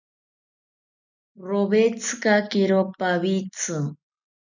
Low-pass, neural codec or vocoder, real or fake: 7.2 kHz; none; real